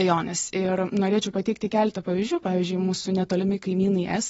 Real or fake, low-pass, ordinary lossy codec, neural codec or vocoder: real; 14.4 kHz; AAC, 24 kbps; none